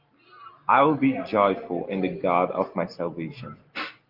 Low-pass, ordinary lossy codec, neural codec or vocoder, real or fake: 5.4 kHz; Opus, 32 kbps; none; real